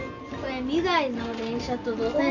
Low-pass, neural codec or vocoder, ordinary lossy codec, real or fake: 7.2 kHz; none; none; real